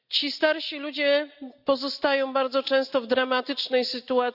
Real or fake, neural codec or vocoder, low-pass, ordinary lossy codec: real; none; 5.4 kHz; AAC, 48 kbps